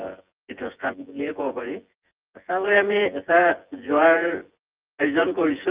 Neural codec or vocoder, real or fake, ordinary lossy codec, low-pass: vocoder, 24 kHz, 100 mel bands, Vocos; fake; Opus, 24 kbps; 3.6 kHz